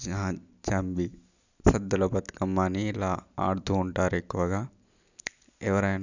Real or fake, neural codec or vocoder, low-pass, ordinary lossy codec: real; none; 7.2 kHz; none